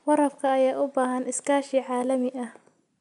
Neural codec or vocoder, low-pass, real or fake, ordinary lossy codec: none; 10.8 kHz; real; MP3, 96 kbps